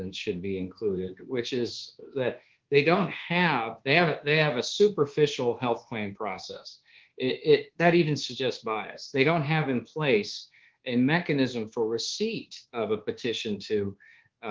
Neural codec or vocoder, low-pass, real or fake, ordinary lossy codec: codec, 24 kHz, 1.2 kbps, DualCodec; 7.2 kHz; fake; Opus, 16 kbps